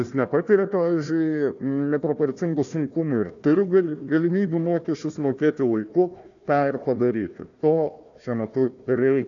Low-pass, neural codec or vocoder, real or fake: 7.2 kHz; codec, 16 kHz, 1 kbps, FunCodec, trained on Chinese and English, 50 frames a second; fake